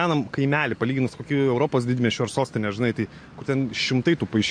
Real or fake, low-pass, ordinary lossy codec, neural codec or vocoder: real; 9.9 kHz; MP3, 48 kbps; none